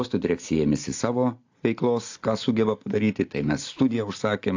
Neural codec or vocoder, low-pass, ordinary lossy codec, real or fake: none; 7.2 kHz; AAC, 48 kbps; real